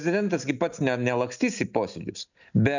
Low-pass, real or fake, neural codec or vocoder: 7.2 kHz; real; none